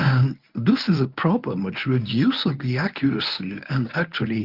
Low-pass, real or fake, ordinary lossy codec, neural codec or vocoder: 5.4 kHz; fake; Opus, 24 kbps; codec, 24 kHz, 0.9 kbps, WavTokenizer, medium speech release version 1